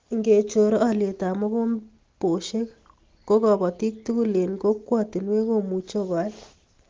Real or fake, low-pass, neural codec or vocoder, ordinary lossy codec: real; 7.2 kHz; none; Opus, 16 kbps